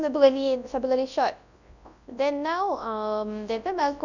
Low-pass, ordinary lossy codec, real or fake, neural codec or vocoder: 7.2 kHz; none; fake; codec, 24 kHz, 0.9 kbps, WavTokenizer, large speech release